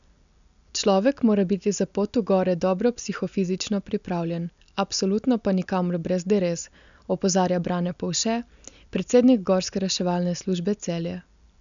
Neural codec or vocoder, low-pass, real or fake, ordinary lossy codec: none; 7.2 kHz; real; none